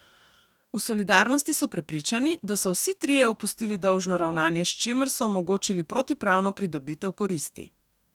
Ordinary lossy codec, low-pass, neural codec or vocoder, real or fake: none; 19.8 kHz; codec, 44.1 kHz, 2.6 kbps, DAC; fake